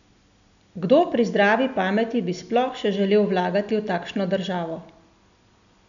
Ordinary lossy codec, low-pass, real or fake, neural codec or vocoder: none; 7.2 kHz; real; none